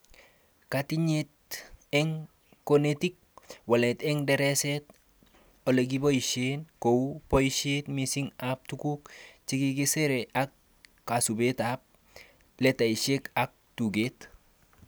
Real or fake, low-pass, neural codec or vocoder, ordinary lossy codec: real; none; none; none